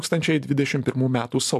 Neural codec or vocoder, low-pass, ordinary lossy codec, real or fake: none; 14.4 kHz; MP3, 96 kbps; real